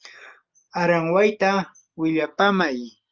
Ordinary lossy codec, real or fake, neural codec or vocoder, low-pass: Opus, 24 kbps; real; none; 7.2 kHz